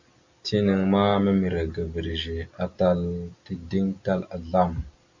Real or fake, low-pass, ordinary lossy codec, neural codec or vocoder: real; 7.2 kHz; MP3, 64 kbps; none